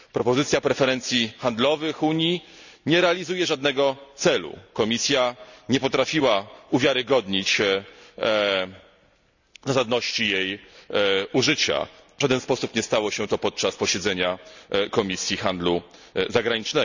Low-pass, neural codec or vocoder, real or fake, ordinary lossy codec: 7.2 kHz; none; real; none